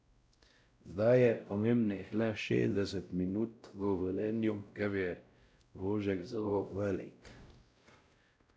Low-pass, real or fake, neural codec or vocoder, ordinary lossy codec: none; fake; codec, 16 kHz, 0.5 kbps, X-Codec, WavLM features, trained on Multilingual LibriSpeech; none